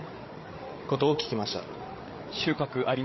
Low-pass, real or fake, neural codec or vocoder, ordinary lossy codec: 7.2 kHz; fake; codec, 16 kHz, 8 kbps, FreqCodec, larger model; MP3, 24 kbps